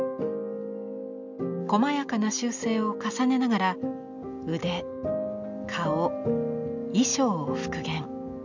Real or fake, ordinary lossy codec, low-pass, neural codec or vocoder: real; none; 7.2 kHz; none